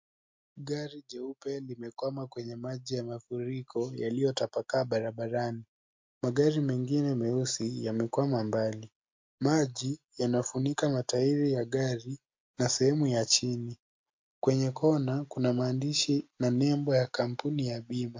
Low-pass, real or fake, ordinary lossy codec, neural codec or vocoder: 7.2 kHz; real; MP3, 48 kbps; none